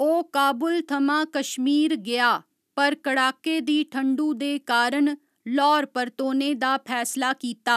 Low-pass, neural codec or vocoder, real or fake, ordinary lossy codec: 14.4 kHz; none; real; none